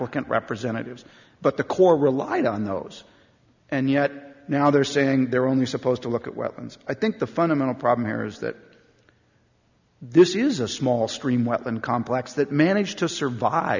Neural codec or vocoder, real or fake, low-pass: none; real; 7.2 kHz